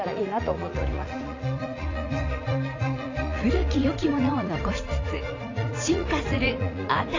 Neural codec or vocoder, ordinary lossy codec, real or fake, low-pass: vocoder, 44.1 kHz, 80 mel bands, Vocos; AAC, 32 kbps; fake; 7.2 kHz